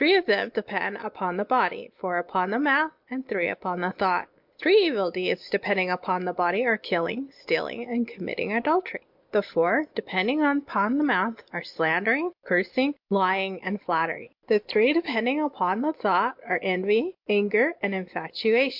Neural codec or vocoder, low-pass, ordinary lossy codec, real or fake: none; 5.4 kHz; MP3, 48 kbps; real